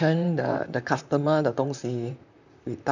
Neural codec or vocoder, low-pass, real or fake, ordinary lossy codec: vocoder, 44.1 kHz, 128 mel bands, Pupu-Vocoder; 7.2 kHz; fake; none